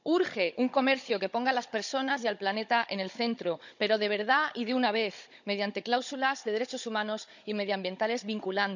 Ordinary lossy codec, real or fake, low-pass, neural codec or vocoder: none; fake; 7.2 kHz; codec, 16 kHz, 16 kbps, FunCodec, trained on Chinese and English, 50 frames a second